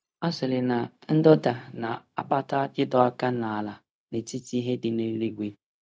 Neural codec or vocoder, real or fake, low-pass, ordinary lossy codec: codec, 16 kHz, 0.4 kbps, LongCat-Audio-Codec; fake; none; none